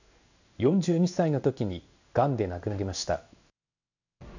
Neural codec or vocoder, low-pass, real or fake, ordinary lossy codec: codec, 16 kHz in and 24 kHz out, 1 kbps, XY-Tokenizer; 7.2 kHz; fake; none